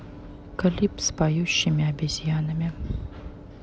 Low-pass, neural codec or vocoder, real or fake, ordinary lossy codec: none; none; real; none